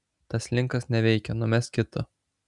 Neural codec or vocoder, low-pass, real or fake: none; 10.8 kHz; real